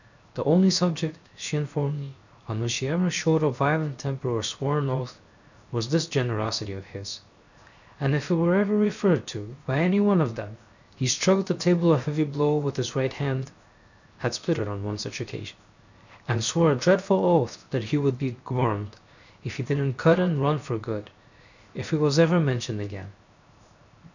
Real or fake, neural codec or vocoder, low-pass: fake; codec, 16 kHz, 0.7 kbps, FocalCodec; 7.2 kHz